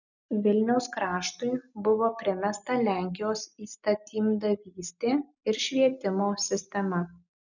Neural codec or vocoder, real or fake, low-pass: none; real; 7.2 kHz